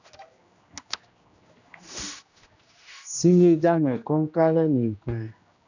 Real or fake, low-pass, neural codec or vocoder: fake; 7.2 kHz; codec, 16 kHz, 1 kbps, X-Codec, HuBERT features, trained on general audio